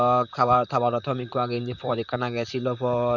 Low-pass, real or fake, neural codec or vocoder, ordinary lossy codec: 7.2 kHz; fake; vocoder, 44.1 kHz, 128 mel bands, Pupu-Vocoder; none